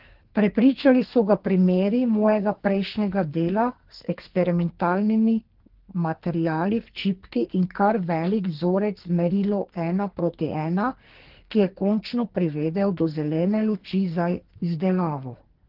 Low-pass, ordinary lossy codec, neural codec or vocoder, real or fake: 5.4 kHz; Opus, 16 kbps; codec, 44.1 kHz, 2.6 kbps, SNAC; fake